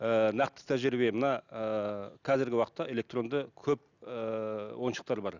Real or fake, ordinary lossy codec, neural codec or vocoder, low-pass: real; none; none; 7.2 kHz